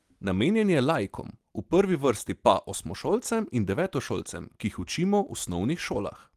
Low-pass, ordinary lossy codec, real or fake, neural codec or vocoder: 14.4 kHz; Opus, 24 kbps; real; none